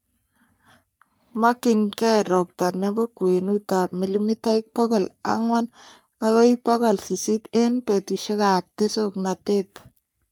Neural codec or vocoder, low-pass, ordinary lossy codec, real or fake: codec, 44.1 kHz, 3.4 kbps, Pupu-Codec; none; none; fake